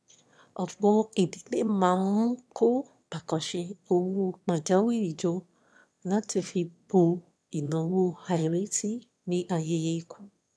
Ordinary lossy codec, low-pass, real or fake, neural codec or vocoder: none; none; fake; autoencoder, 22.05 kHz, a latent of 192 numbers a frame, VITS, trained on one speaker